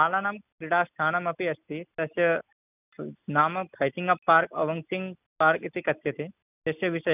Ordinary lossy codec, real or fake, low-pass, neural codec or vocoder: none; real; 3.6 kHz; none